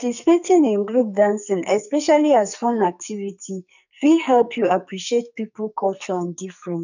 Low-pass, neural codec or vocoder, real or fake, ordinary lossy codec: 7.2 kHz; codec, 44.1 kHz, 2.6 kbps, SNAC; fake; none